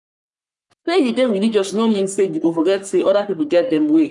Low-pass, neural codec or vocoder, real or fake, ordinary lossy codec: 10.8 kHz; codec, 44.1 kHz, 3.4 kbps, Pupu-Codec; fake; none